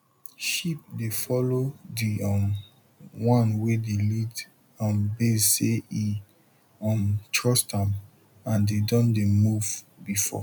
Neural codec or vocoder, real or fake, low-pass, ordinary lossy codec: none; real; 19.8 kHz; none